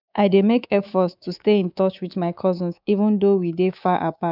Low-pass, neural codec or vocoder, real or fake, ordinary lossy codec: 5.4 kHz; codec, 24 kHz, 3.1 kbps, DualCodec; fake; none